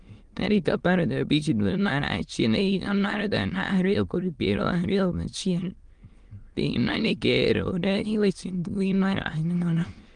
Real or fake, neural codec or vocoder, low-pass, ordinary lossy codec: fake; autoencoder, 22.05 kHz, a latent of 192 numbers a frame, VITS, trained on many speakers; 9.9 kHz; Opus, 24 kbps